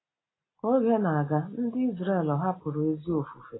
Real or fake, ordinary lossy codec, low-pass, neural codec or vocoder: real; AAC, 16 kbps; 7.2 kHz; none